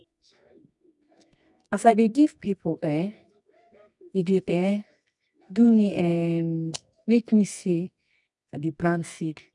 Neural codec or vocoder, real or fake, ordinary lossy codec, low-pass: codec, 24 kHz, 0.9 kbps, WavTokenizer, medium music audio release; fake; none; 10.8 kHz